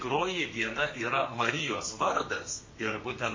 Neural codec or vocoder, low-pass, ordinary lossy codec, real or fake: codec, 32 kHz, 1.9 kbps, SNAC; 7.2 kHz; MP3, 32 kbps; fake